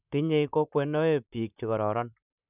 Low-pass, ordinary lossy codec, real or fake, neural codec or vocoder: 3.6 kHz; none; fake; vocoder, 44.1 kHz, 128 mel bands, Pupu-Vocoder